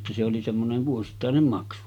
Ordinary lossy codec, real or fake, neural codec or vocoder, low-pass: none; real; none; 19.8 kHz